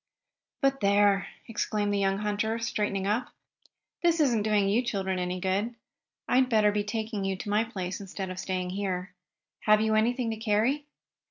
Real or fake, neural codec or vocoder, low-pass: real; none; 7.2 kHz